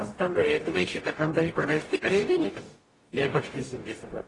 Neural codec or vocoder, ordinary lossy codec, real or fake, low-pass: codec, 44.1 kHz, 0.9 kbps, DAC; AAC, 32 kbps; fake; 10.8 kHz